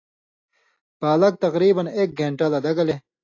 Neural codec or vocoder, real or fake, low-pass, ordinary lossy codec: none; real; 7.2 kHz; AAC, 48 kbps